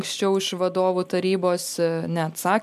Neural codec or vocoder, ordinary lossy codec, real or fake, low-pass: none; MP3, 96 kbps; real; 14.4 kHz